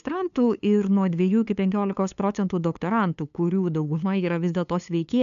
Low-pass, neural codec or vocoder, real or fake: 7.2 kHz; codec, 16 kHz, 2 kbps, FunCodec, trained on LibriTTS, 25 frames a second; fake